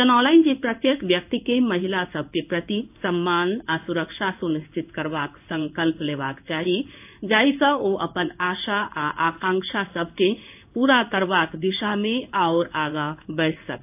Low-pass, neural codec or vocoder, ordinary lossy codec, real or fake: 3.6 kHz; codec, 16 kHz in and 24 kHz out, 1 kbps, XY-Tokenizer; AAC, 32 kbps; fake